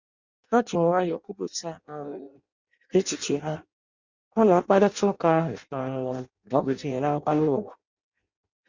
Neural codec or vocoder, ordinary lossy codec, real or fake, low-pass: codec, 16 kHz in and 24 kHz out, 0.6 kbps, FireRedTTS-2 codec; Opus, 64 kbps; fake; 7.2 kHz